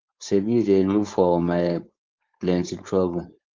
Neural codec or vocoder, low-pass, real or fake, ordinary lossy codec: codec, 16 kHz, 4.8 kbps, FACodec; 7.2 kHz; fake; Opus, 24 kbps